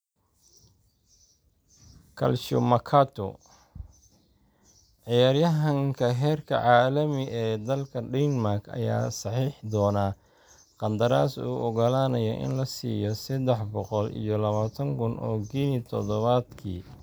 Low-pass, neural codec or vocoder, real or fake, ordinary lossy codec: none; none; real; none